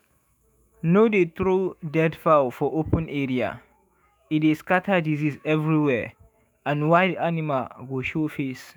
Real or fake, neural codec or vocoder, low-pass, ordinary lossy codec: fake; autoencoder, 48 kHz, 128 numbers a frame, DAC-VAE, trained on Japanese speech; none; none